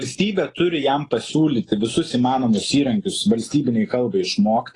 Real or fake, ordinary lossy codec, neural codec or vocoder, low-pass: real; AAC, 32 kbps; none; 10.8 kHz